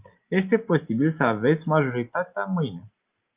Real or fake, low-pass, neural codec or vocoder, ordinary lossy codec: real; 3.6 kHz; none; Opus, 24 kbps